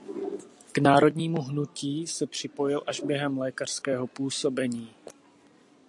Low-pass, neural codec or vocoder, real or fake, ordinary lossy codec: 10.8 kHz; none; real; AAC, 64 kbps